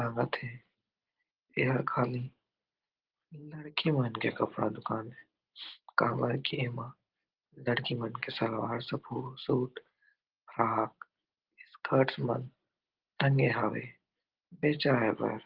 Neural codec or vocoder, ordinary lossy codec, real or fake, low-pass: none; Opus, 16 kbps; real; 5.4 kHz